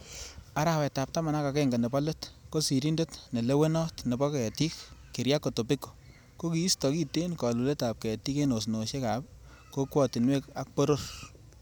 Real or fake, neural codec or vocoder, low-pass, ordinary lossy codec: real; none; none; none